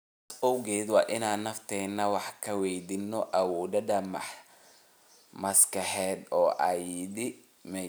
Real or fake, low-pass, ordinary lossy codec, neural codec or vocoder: real; none; none; none